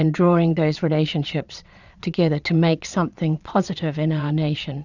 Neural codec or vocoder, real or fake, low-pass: vocoder, 22.05 kHz, 80 mel bands, Vocos; fake; 7.2 kHz